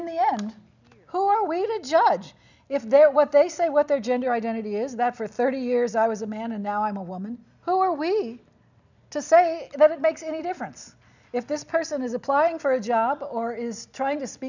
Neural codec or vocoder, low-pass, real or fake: none; 7.2 kHz; real